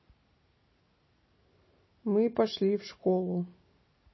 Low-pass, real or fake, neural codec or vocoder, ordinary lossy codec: 7.2 kHz; real; none; MP3, 24 kbps